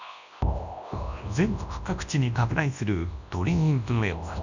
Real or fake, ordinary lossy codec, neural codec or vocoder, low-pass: fake; none; codec, 24 kHz, 0.9 kbps, WavTokenizer, large speech release; 7.2 kHz